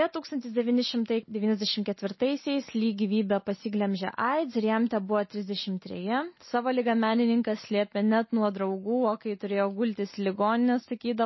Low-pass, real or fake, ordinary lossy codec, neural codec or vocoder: 7.2 kHz; real; MP3, 24 kbps; none